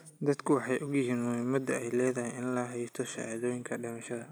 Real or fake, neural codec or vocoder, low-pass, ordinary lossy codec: real; none; none; none